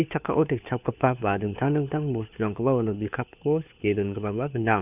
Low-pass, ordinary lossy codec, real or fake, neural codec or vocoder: 3.6 kHz; none; fake; codec, 16 kHz, 4 kbps, FunCodec, trained on LibriTTS, 50 frames a second